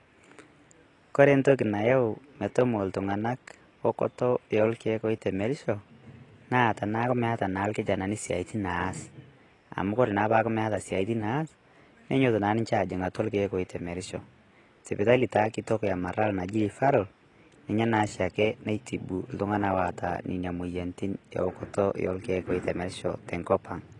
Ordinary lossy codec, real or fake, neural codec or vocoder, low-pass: AAC, 32 kbps; real; none; 10.8 kHz